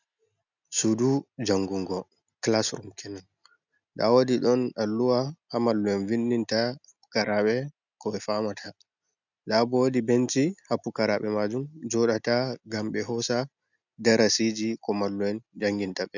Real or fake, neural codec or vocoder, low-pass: real; none; 7.2 kHz